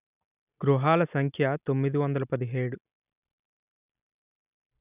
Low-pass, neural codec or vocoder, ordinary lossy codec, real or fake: 3.6 kHz; none; none; real